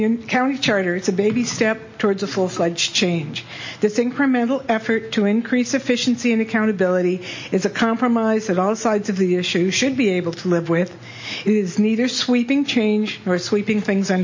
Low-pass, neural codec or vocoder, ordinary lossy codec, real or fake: 7.2 kHz; none; MP3, 32 kbps; real